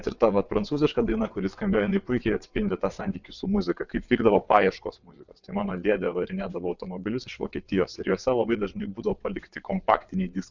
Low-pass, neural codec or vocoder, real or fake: 7.2 kHz; vocoder, 22.05 kHz, 80 mel bands, WaveNeXt; fake